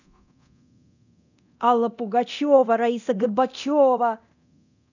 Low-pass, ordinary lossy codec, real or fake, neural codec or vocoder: 7.2 kHz; none; fake; codec, 24 kHz, 0.9 kbps, DualCodec